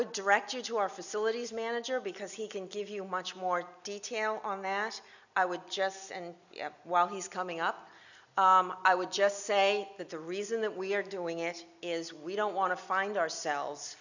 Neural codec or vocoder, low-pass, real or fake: none; 7.2 kHz; real